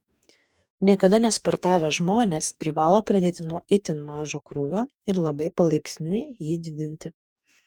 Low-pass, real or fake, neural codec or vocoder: 19.8 kHz; fake; codec, 44.1 kHz, 2.6 kbps, DAC